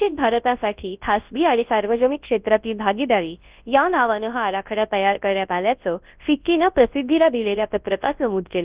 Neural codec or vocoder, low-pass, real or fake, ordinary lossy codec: codec, 24 kHz, 0.9 kbps, WavTokenizer, large speech release; 3.6 kHz; fake; Opus, 32 kbps